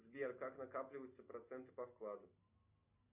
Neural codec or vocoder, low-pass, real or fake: none; 3.6 kHz; real